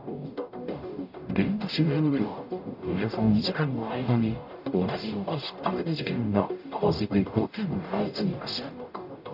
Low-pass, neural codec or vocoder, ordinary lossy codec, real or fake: 5.4 kHz; codec, 44.1 kHz, 0.9 kbps, DAC; none; fake